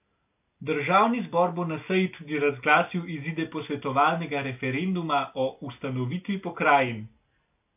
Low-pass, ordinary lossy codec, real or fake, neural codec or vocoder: 3.6 kHz; AAC, 32 kbps; real; none